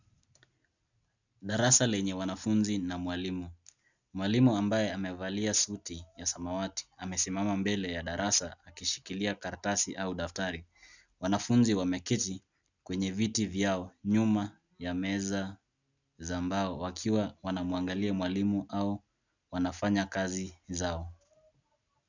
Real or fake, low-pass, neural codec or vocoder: real; 7.2 kHz; none